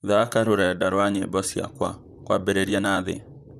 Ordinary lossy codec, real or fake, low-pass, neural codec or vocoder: none; fake; 14.4 kHz; vocoder, 44.1 kHz, 128 mel bands, Pupu-Vocoder